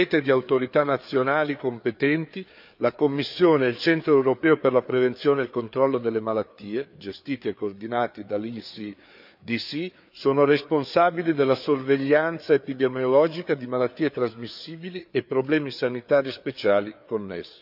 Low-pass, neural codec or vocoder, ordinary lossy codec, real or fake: 5.4 kHz; codec, 16 kHz, 4 kbps, FreqCodec, larger model; none; fake